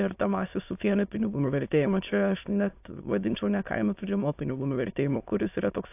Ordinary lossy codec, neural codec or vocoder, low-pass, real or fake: AAC, 32 kbps; autoencoder, 22.05 kHz, a latent of 192 numbers a frame, VITS, trained on many speakers; 3.6 kHz; fake